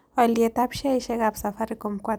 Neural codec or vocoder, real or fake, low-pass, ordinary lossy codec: none; real; none; none